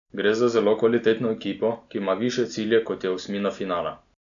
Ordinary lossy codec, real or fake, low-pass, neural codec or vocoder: none; real; 7.2 kHz; none